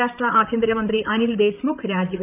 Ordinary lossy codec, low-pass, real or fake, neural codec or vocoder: none; 3.6 kHz; fake; vocoder, 44.1 kHz, 128 mel bands, Pupu-Vocoder